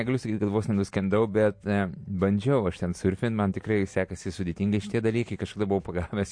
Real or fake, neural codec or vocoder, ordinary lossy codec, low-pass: real; none; MP3, 48 kbps; 9.9 kHz